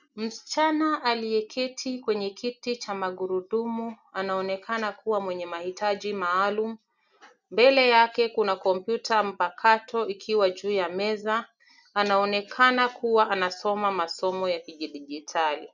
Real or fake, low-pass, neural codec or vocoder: real; 7.2 kHz; none